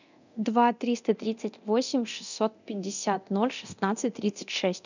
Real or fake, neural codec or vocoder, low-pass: fake; codec, 24 kHz, 0.9 kbps, DualCodec; 7.2 kHz